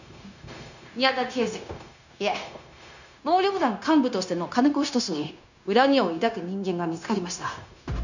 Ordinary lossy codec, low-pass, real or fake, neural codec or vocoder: none; 7.2 kHz; fake; codec, 16 kHz, 0.9 kbps, LongCat-Audio-Codec